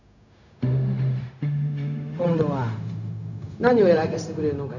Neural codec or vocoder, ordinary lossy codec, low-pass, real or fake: codec, 16 kHz, 0.4 kbps, LongCat-Audio-Codec; none; 7.2 kHz; fake